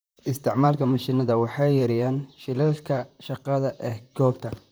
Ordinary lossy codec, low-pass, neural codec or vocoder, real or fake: none; none; vocoder, 44.1 kHz, 128 mel bands, Pupu-Vocoder; fake